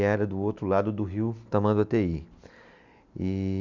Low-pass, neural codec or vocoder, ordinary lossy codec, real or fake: 7.2 kHz; none; none; real